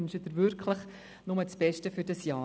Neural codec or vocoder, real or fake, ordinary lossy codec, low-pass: none; real; none; none